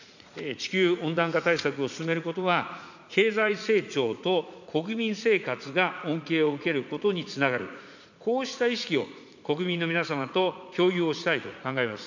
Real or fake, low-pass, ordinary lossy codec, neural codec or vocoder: fake; 7.2 kHz; none; vocoder, 44.1 kHz, 80 mel bands, Vocos